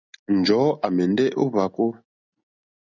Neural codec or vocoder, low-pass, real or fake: none; 7.2 kHz; real